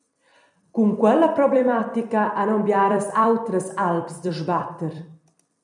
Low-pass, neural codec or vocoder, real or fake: 10.8 kHz; vocoder, 44.1 kHz, 128 mel bands every 256 samples, BigVGAN v2; fake